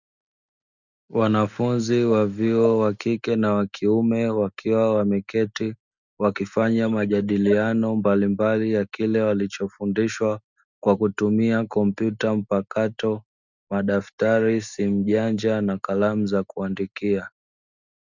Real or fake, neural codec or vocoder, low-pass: real; none; 7.2 kHz